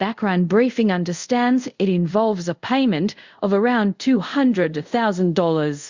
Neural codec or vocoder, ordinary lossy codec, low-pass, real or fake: codec, 24 kHz, 0.5 kbps, DualCodec; Opus, 64 kbps; 7.2 kHz; fake